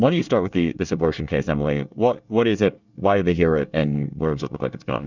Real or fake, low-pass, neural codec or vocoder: fake; 7.2 kHz; codec, 24 kHz, 1 kbps, SNAC